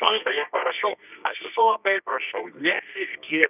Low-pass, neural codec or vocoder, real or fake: 3.6 kHz; codec, 24 kHz, 0.9 kbps, WavTokenizer, medium music audio release; fake